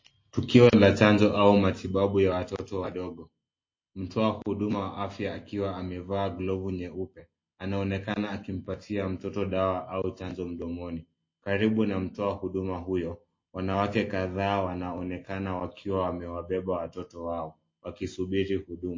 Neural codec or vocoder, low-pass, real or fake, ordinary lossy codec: none; 7.2 kHz; real; MP3, 32 kbps